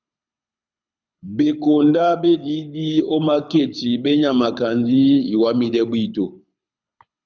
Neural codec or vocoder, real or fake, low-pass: codec, 24 kHz, 6 kbps, HILCodec; fake; 7.2 kHz